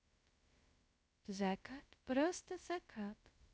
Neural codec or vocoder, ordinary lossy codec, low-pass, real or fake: codec, 16 kHz, 0.2 kbps, FocalCodec; none; none; fake